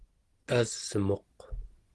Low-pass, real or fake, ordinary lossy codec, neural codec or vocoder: 10.8 kHz; real; Opus, 16 kbps; none